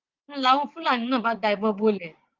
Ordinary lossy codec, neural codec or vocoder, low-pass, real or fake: Opus, 32 kbps; vocoder, 22.05 kHz, 80 mel bands, WaveNeXt; 7.2 kHz; fake